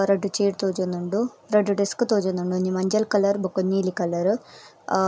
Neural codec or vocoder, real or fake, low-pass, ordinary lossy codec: none; real; none; none